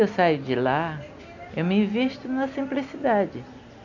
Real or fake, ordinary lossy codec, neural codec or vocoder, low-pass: real; none; none; 7.2 kHz